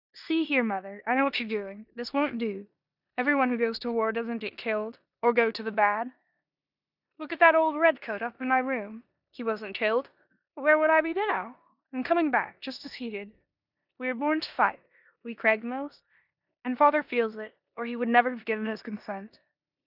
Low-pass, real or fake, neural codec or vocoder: 5.4 kHz; fake; codec, 16 kHz in and 24 kHz out, 0.9 kbps, LongCat-Audio-Codec, four codebook decoder